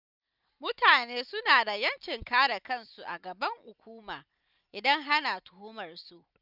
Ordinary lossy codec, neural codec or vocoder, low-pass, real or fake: none; none; 5.4 kHz; real